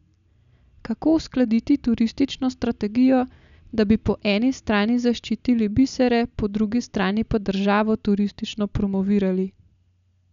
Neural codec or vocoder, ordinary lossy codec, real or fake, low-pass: none; none; real; 7.2 kHz